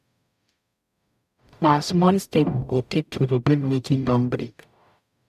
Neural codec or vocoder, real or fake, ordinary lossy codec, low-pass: codec, 44.1 kHz, 0.9 kbps, DAC; fake; none; 14.4 kHz